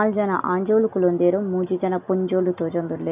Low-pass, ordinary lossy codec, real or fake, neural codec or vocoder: 3.6 kHz; none; real; none